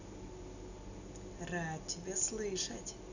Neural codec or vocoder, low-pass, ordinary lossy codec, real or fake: none; 7.2 kHz; none; real